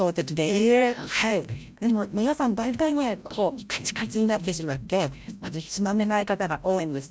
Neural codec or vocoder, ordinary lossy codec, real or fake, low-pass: codec, 16 kHz, 0.5 kbps, FreqCodec, larger model; none; fake; none